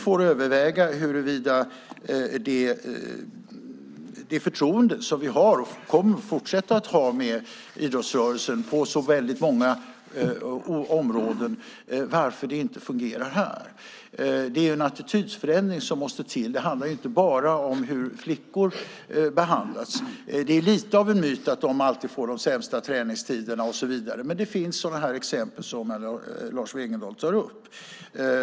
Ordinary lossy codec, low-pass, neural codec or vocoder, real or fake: none; none; none; real